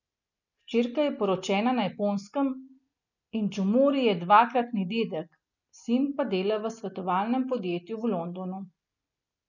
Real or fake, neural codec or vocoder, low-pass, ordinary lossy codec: real; none; 7.2 kHz; none